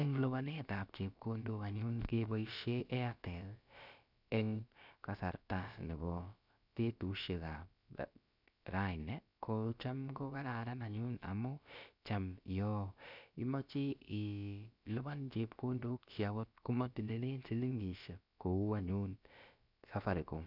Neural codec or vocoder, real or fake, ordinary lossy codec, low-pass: codec, 16 kHz, about 1 kbps, DyCAST, with the encoder's durations; fake; none; 5.4 kHz